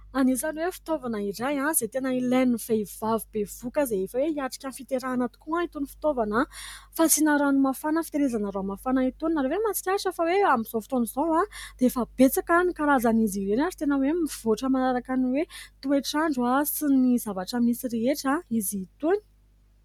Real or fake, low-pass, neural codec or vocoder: real; 19.8 kHz; none